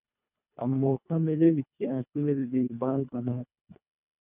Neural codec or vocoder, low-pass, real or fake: codec, 24 kHz, 1.5 kbps, HILCodec; 3.6 kHz; fake